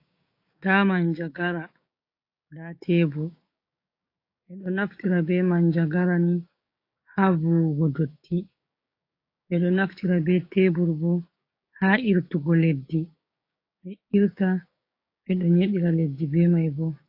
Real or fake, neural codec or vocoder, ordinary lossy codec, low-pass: fake; codec, 16 kHz, 6 kbps, DAC; AAC, 32 kbps; 5.4 kHz